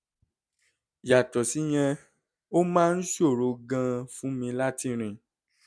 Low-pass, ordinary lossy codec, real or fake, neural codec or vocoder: none; none; real; none